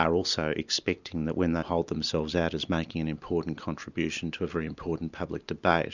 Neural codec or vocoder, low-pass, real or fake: none; 7.2 kHz; real